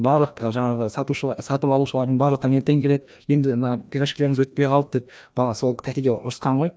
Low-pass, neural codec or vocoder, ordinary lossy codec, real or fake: none; codec, 16 kHz, 1 kbps, FreqCodec, larger model; none; fake